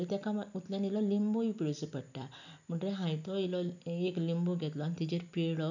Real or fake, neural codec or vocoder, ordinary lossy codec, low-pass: real; none; none; 7.2 kHz